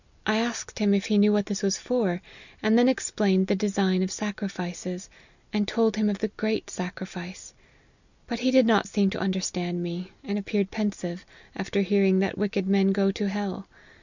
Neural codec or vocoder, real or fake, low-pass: none; real; 7.2 kHz